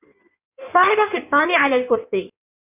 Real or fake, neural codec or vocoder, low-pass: fake; codec, 16 kHz in and 24 kHz out, 1.1 kbps, FireRedTTS-2 codec; 3.6 kHz